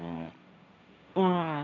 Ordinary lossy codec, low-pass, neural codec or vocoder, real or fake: none; none; codec, 16 kHz, 1.1 kbps, Voila-Tokenizer; fake